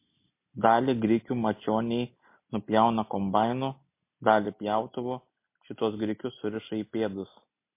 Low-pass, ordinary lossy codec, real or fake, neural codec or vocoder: 3.6 kHz; MP3, 24 kbps; real; none